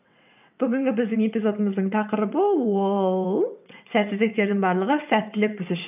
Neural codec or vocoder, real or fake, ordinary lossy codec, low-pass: vocoder, 22.05 kHz, 80 mel bands, WaveNeXt; fake; none; 3.6 kHz